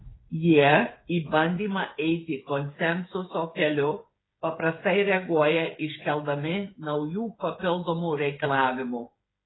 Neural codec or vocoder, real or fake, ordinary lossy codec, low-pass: codec, 16 kHz, 8 kbps, FreqCodec, smaller model; fake; AAC, 16 kbps; 7.2 kHz